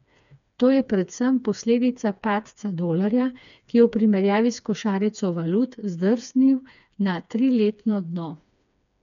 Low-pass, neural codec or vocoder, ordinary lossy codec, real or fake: 7.2 kHz; codec, 16 kHz, 4 kbps, FreqCodec, smaller model; none; fake